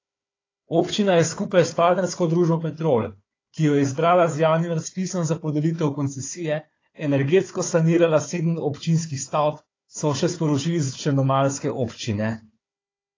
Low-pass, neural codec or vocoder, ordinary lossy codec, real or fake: 7.2 kHz; codec, 16 kHz, 4 kbps, FunCodec, trained on Chinese and English, 50 frames a second; AAC, 32 kbps; fake